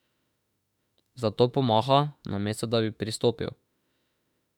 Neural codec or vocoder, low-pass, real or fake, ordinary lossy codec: autoencoder, 48 kHz, 32 numbers a frame, DAC-VAE, trained on Japanese speech; 19.8 kHz; fake; none